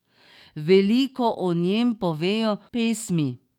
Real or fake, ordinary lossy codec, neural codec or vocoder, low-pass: fake; none; codec, 44.1 kHz, 7.8 kbps, DAC; 19.8 kHz